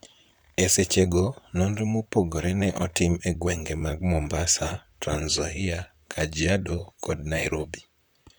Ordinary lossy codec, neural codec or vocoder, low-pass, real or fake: none; vocoder, 44.1 kHz, 128 mel bands, Pupu-Vocoder; none; fake